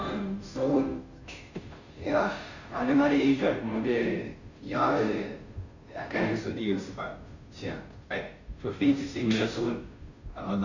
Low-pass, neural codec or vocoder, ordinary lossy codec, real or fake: 7.2 kHz; codec, 16 kHz, 0.5 kbps, FunCodec, trained on Chinese and English, 25 frames a second; none; fake